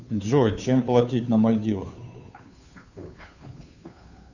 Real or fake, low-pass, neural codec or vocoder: fake; 7.2 kHz; codec, 16 kHz, 2 kbps, FunCodec, trained on Chinese and English, 25 frames a second